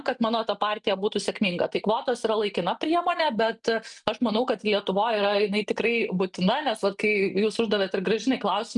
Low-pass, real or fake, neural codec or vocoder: 10.8 kHz; real; none